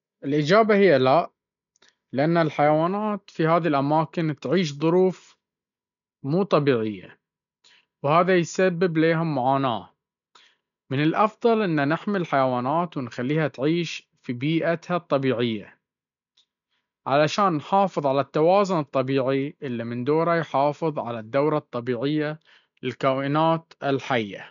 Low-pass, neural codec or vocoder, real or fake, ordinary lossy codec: 7.2 kHz; none; real; none